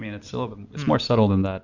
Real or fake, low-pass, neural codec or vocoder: real; 7.2 kHz; none